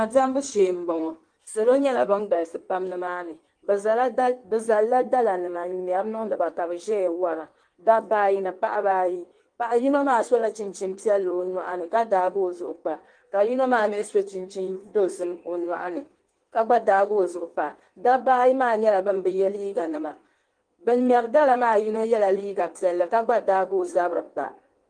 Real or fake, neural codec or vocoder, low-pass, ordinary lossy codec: fake; codec, 16 kHz in and 24 kHz out, 1.1 kbps, FireRedTTS-2 codec; 9.9 kHz; Opus, 24 kbps